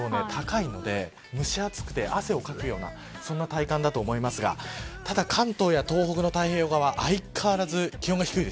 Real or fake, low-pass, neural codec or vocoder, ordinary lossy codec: real; none; none; none